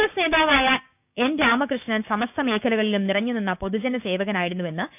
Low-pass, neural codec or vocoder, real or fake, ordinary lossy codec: 3.6 kHz; codec, 16 kHz, 6 kbps, DAC; fake; none